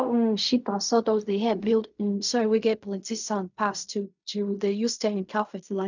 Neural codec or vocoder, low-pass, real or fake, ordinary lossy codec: codec, 16 kHz in and 24 kHz out, 0.4 kbps, LongCat-Audio-Codec, fine tuned four codebook decoder; 7.2 kHz; fake; none